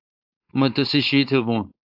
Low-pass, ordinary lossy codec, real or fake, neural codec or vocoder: 5.4 kHz; AAC, 48 kbps; fake; codec, 16 kHz, 4.8 kbps, FACodec